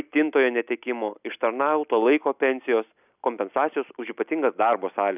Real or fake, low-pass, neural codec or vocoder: real; 3.6 kHz; none